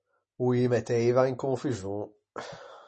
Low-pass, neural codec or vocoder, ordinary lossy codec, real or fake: 10.8 kHz; none; MP3, 32 kbps; real